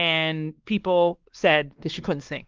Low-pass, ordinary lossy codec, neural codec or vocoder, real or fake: 7.2 kHz; Opus, 32 kbps; codec, 16 kHz, 2 kbps, X-Codec, WavLM features, trained on Multilingual LibriSpeech; fake